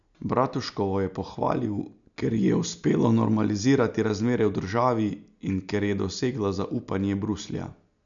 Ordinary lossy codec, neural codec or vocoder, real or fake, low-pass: none; none; real; 7.2 kHz